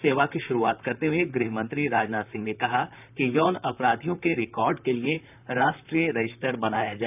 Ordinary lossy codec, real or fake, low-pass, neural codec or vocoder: none; fake; 3.6 kHz; vocoder, 44.1 kHz, 128 mel bands, Pupu-Vocoder